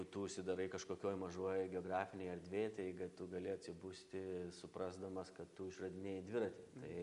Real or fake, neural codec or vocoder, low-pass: real; none; 10.8 kHz